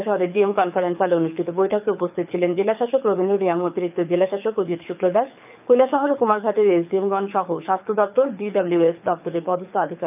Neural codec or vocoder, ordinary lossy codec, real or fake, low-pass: codec, 24 kHz, 6 kbps, HILCodec; none; fake; 3.6 kHz